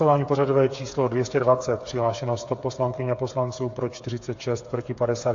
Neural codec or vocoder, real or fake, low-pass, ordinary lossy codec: codec, 16 kHz, 8 kbps, FreqCodec, smaller model; fake; 7.2 kHz; AAC, 48 kbps